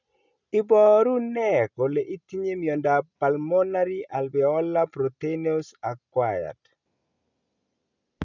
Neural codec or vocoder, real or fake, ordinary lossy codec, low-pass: none; real; none; 7.2 kHz